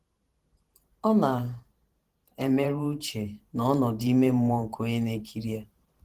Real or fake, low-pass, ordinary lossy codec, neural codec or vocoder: fake; 14.4 kHz; Opus, 16 kbps; vocoder, 44.1 kHz, 128 mel bands every 512 samples, BigVGAN v2